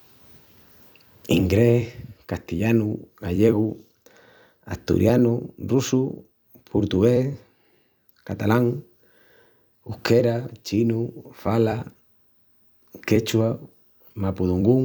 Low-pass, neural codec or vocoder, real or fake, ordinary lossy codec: none; vocoder, 44.1 kHz, 128 mel bands every 256 samples, BigVGAN v2; fake; none